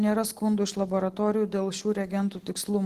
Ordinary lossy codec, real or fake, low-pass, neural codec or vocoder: Opus, 16 kbps; real; 14.4 kHz; none